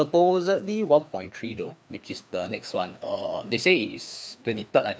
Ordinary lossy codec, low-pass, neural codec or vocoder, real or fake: none; none; codec, 16 kHz, 2 kbps, FreqCodec, larger model; fake